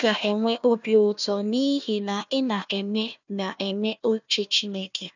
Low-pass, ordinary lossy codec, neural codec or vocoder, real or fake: 7.2 kHz; none; codec, 16 kHz, 1 kbps, FunCodec, trained on Chinese and English, 50 frames a second; fake